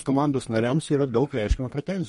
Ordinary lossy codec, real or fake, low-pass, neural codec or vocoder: MP3, 48 kbps; fake; 14.4 kHz; codec, 32 kHz, 1.9 kbps, SNAC